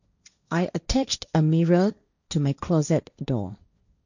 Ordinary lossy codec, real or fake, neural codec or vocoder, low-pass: none; fake; codec, 16 kHz, 1.1 kbps, Voila-Tokenizer; 7.2 kHz